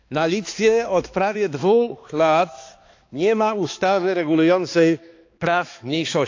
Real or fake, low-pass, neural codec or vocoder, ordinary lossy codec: fake; 7.2 kHz; codec, 16 kHz, 2 kbps, X-Codec, HuBERT features, trained on balanced general audio; AAC, 48 kbps